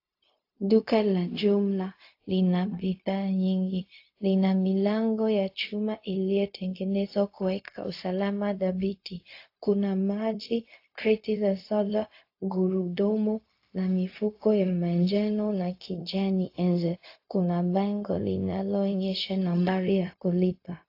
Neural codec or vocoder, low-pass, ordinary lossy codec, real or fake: codec, 16 kHz, 0.4 kbps, LongCat-Audio-Codec; 5.4 kHz; AAC, 32 kbps; fake